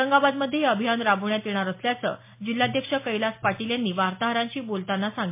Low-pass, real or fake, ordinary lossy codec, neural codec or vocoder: 3.6 kHz; real; MP3, 24 kbps; none